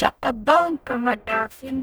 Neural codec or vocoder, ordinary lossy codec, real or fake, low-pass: codec, 44.1 kHz, 0.9 kbps, DAC; none; fake; none